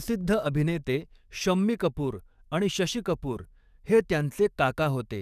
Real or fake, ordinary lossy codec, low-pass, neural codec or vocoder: fake; none; 14.4 kHz; codec, 44.1 kHz, 7.8 kbps, DAC